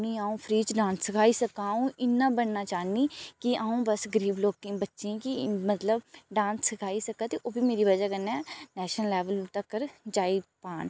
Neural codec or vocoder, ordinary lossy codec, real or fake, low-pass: none; none; real; none